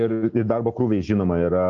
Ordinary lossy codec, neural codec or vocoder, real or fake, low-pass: Opus, 24 kbps; none; real; 7.2 kHz